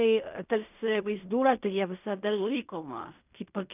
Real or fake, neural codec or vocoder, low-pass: fake; codec, 16 kHz in and 24 kHz out, 0.4 kbps, LongCat-Audio-Codec, fine tuned four codebook decoder; 3.6 kHz